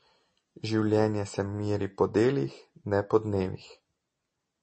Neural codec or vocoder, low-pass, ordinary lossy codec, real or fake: none; 10.8 kHz; MP3, 32 kbps; real